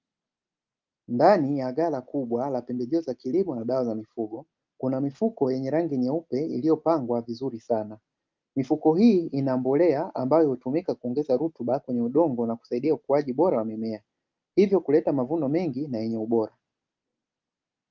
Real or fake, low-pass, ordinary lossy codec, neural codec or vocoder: real; 7.2 kHz; Opus, 24 kbps; none